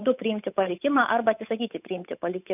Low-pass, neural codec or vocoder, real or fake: 3.6 kHz; codec, 16 kHz, 8 kbps, FunCodec, trained on Chinese and English, 25 frames a second; fake